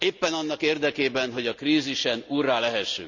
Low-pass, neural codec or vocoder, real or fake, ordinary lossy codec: 7.2 kHz; none; real; none